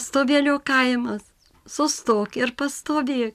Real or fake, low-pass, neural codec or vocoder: real; 14.4 kHz; none